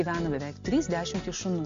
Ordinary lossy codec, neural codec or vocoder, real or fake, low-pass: MP3, 48 kbps; none; real; 7.2 kHz